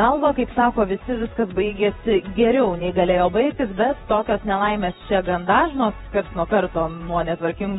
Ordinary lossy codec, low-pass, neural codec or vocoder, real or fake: AAC, 16 kbps; 19.8 kHz; vocoder, 48 kHz, 128 mel bands, Vocos; fake